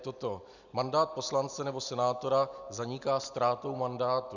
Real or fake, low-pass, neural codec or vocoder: real; 7.2 kHz; none